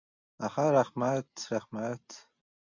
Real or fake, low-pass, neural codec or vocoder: real; 7.2 kHz; none